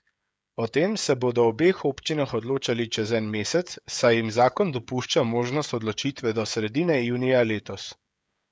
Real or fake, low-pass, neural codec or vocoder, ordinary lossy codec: fake; none; codec, 16 kHz, 16 kbps, FreqCodec, smaller model; none